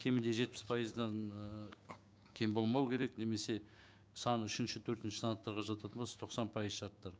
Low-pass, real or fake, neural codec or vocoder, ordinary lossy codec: none; fake; codec, 16 kHz, 6 kbps, DAC; none